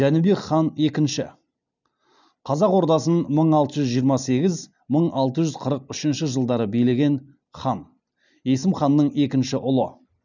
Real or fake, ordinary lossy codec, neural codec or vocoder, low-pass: real; none; none; 7.2 kHz